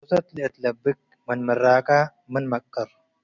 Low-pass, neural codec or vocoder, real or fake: 7.2 kHz; none; real